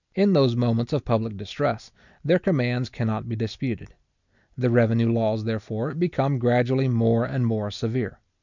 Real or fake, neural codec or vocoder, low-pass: real; none; 7.2 kHz